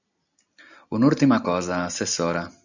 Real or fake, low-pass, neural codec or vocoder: real; 7.2 kHz; none